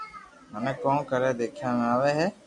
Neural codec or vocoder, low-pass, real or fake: none; 10.8 kHz; real